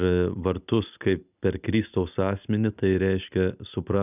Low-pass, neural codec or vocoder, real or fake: 3.6 kHz; none; real